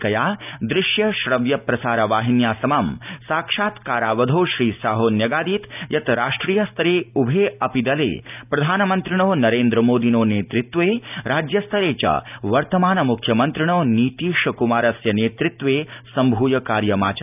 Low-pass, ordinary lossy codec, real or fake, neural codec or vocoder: 3.6 kHz; none; real; none